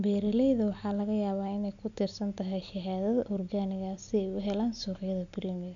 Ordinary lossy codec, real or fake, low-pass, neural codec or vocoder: none; real; 7.2 kHz; none